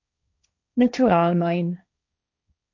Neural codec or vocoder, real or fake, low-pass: codec, 16 kHz, 1.1 kbps, Voila-Tokenizer; fake; 7.2 kHz